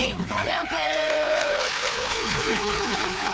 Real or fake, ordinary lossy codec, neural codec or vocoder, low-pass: fake; none; codec, 16 kHz, 2 kbps, FreqCodec, larger model; none